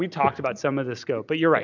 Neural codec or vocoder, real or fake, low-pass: none; real; 7.2 kHz